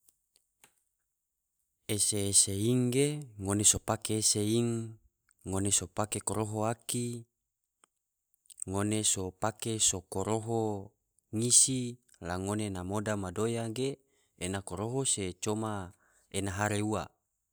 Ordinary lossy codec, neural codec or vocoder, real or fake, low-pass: none; none; real; none